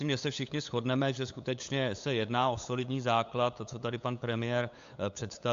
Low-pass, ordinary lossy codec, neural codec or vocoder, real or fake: 7.2 kHz; AAC, 64 kbps; codec, 16 kHz, 8 kbps, FunCodec, trained on LibriTTS, 25 frames a second; fake